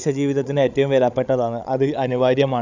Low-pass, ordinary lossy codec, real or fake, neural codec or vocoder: 7.2 kHz; none; fake; codec, 16 kHz, 16 kbps, FreqCodec, larger model